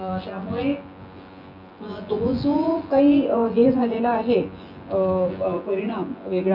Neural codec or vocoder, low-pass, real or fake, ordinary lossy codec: vocoder, 24 kHz, 100 mel bands, Vocos; 5.4 kHz; fake; none